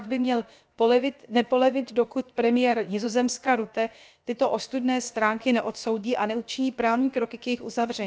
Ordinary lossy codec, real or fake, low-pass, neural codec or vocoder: none; fake; none; codec, 16 kHz, about 1 kbps, DyCAST, with the encoder's durations